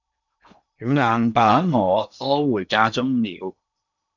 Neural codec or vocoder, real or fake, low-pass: codec, 16 kHz in and 24 kHz out, 0.8 kbps, FocalCodec, streaming, 65536 codes; fake; 7.2 kHz